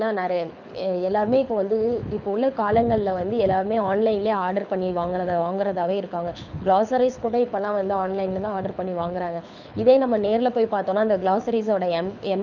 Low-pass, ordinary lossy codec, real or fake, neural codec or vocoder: 7.2 kHz; none; fake; codec, 24 kHz, 6 kbps, HILCodec